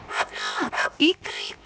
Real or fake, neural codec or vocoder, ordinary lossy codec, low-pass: fake; codec, 16 kHz, 0.7 kbps, FocalCodec; none; none